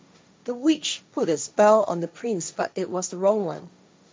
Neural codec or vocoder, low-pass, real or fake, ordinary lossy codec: codec, 16 kHz, 1.1 kbps, Voila-Tokenizer; none; fake; none